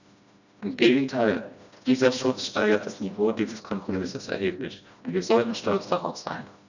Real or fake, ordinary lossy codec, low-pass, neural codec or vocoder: fake; none; 7.2 kHz; codec, 16 kHz, 1 kbps, FreqCodec, smaller model